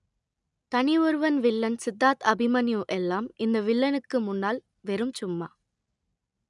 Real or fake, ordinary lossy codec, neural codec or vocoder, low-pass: real; none; none; 10.8 kHz